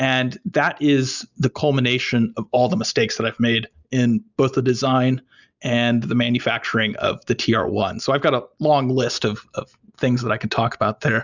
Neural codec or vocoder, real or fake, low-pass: none; real; 7.2 kHz